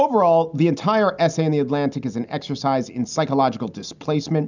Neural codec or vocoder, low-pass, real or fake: none; 7.2 kHz; real